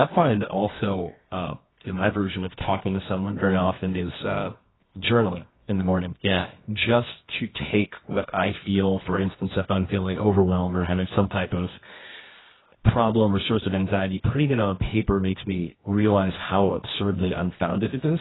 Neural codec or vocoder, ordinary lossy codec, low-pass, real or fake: codec, 24 kHz, 0.9 kbps, WavTokenizer, medium music audio release; AAC, 16 kbps; 7.2 kHz; fake